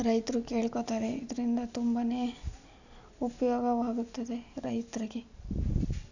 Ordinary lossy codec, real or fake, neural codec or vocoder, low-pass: none; real; none; 7.2 kHz